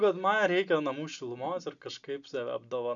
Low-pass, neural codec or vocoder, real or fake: 7.2 kHz; none; real